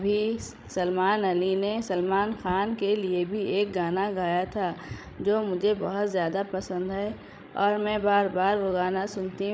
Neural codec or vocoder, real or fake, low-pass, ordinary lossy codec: codec, 16 kHz, 16 kbps, FreqCodec, larger model; fake; none; none